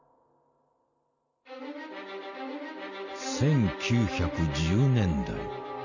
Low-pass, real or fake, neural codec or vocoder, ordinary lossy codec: 7.2 kHz; real; none; none